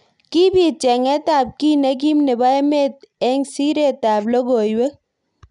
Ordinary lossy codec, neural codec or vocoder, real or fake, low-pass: none; none; real; 10.8 kHz